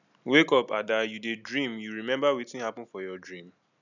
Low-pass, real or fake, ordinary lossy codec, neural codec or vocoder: 7.2 kHz; real; none; none